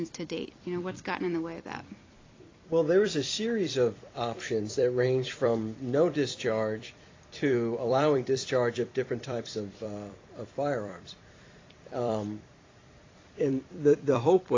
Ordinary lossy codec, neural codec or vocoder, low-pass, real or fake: AAC, 32 kbps; vocoder, 44.1 kHz, 128 mel bands every 256 samples, BigVGAN v2; 7.2 kHz; fake